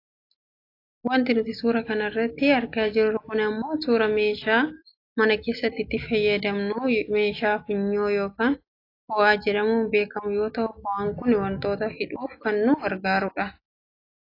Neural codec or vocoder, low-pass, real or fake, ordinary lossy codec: none; 5.4 kHz; real; AAC, 32 kbps